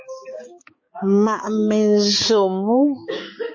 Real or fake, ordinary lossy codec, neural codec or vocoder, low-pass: fake; MP3, 32 kbps; codec, 16 kHz, 4 kbps, X-Codec, HuBERT features, trained on balanced general audio; 7.2 kHz